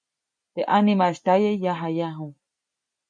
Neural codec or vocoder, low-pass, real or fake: none; 9.9 kHz; real